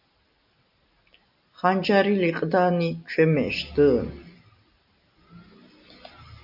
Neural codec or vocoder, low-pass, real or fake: none; 5.4 kHz; real